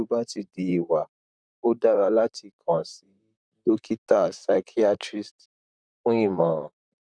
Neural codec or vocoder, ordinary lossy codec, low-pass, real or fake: vocoder, 44.1 kHz, 128 mel bands, Pupu-Vocoder; none; 9.9 kHz; fake